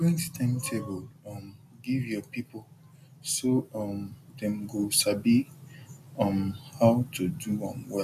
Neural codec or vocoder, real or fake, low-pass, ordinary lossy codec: none; real; 14.4 kHz; none